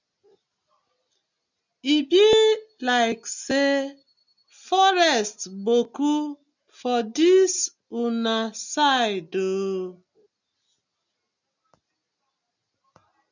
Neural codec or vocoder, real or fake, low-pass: none; real; 7.2 kHz